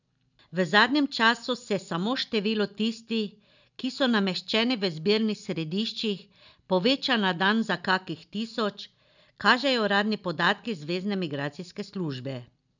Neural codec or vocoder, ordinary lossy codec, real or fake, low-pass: none; none; real; 7.2 kHz